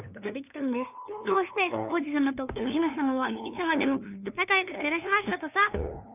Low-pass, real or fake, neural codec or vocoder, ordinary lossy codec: 3.6 kHz; fake; codec, 16 kHz, 2 kbps, FunCodec, trained on LibriTTS, 25 frames a second; none